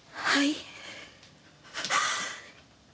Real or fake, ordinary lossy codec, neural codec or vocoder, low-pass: real; none; none; none